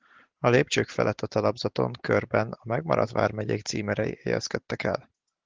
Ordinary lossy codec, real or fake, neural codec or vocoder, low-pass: Opus, 16 kbps; real; none; 7.2 kHz